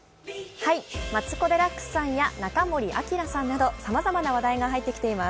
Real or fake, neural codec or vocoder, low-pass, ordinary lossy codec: real; none; none; none